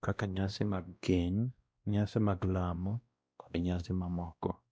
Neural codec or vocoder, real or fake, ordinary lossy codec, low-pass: codec, 16 kHz, 1 kbps, X-Codec, WavLM features, trained on Multilingual LibriSpeech; fake; none; none